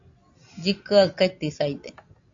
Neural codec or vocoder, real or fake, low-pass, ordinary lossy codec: none; real; 7.2 kHz; AAC, 48 kbps